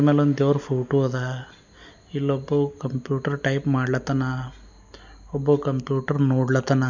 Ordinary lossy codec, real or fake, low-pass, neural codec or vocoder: none; real; 7.2 kHz; none